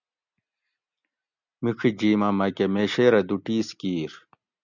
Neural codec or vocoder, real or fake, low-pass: none; real; 7.2 kHz